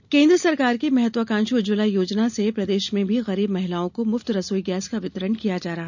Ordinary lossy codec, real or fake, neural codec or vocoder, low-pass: none; real; none; 7.2 kHz